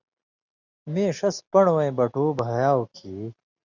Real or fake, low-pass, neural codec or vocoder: real; 7.2 kHz; none